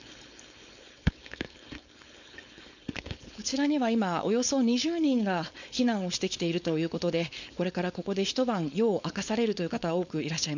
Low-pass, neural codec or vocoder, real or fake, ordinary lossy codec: 7.2 kHz; codec, 16 kHz, 4.8 kbps, FACodec; fake; none